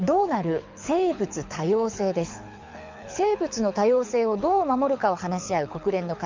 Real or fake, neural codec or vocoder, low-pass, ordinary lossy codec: fake; codec, 24 kHz, 6 kbps, HILCodec; 7.2 kHz; MP3, 64 kbps